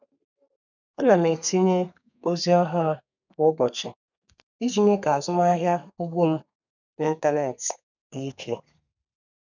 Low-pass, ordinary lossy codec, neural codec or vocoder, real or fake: 7.2 kHz; none; codec, 32 kHz, 1.9 kbps, SNAC; fake